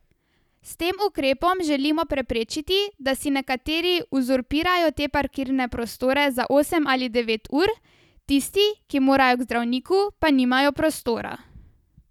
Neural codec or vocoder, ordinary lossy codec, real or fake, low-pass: none; none; real; 19.8 kHz